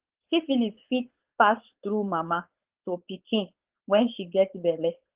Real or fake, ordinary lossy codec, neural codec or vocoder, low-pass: fake; Opus, 16 kbps; codec, 16 kHz, 4.8 kbps, FACodec; 3.6 kHz